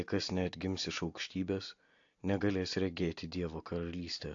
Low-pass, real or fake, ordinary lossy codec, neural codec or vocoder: 7.2 kHz; real; AAC, 48 kbps; none